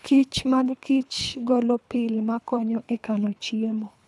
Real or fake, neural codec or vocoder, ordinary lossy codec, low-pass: fake; codec, 24 kHz, 3 kbps, HILCodec; none; none